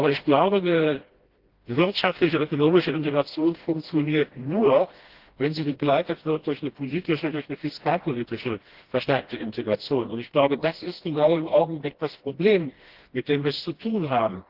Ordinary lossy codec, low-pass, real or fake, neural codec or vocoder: Opus, 16 kbps; 5.4 kHz; fake; codec, 16 kHz, 1 kbps, FreqCodec, smaller model